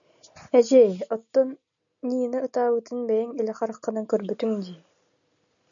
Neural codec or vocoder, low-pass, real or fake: none; 7.2 kHz; real